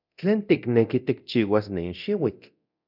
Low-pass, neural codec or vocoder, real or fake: 5.4 kHz; codec, 24 kHz, 0.9 kbps, DualCodec; fake